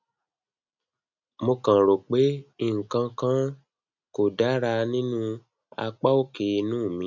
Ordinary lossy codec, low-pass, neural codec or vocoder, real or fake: none; 7.2 kHz; none; real